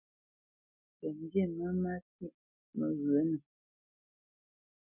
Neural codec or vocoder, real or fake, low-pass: none; real; 3.6 kHz